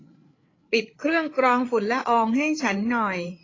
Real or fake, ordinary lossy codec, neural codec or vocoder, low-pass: fake; AAC, 32 kbps; codec, 16 kHz, 8 kbps, FreqCodec, larger model; 7.2 kHz